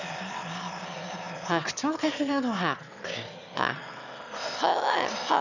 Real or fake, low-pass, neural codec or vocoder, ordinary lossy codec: fake; 7.2 kHz; autoencoder, 22.05 kHz, a latent of 192 numbers a frame, VITS, trained on one speaker; none